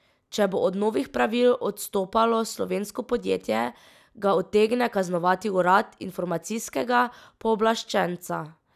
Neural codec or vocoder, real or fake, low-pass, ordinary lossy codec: none; real; 14.4 kHz; none